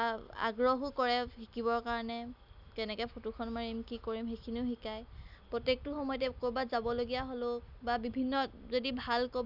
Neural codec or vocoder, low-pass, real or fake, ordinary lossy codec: none; 5.4 kHz; real; none